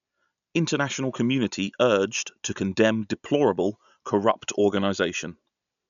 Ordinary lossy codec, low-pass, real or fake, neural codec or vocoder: none; 7.2 kHz; real; none